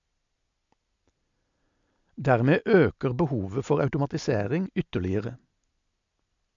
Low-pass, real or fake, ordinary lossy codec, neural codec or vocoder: 7.2 kHz; real; MP3, 64 kbps; none